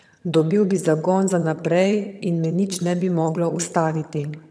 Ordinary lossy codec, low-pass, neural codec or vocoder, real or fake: none; none; vocoder, 22.05 kHz, 80 mel bands, HiFi-GAN; fake